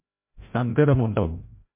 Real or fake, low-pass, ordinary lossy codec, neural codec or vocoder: fake; 3.6 kHz; MP3, 24 kbps; codec, 16 kHz, 0.5 kbps, FreqCodec, larger model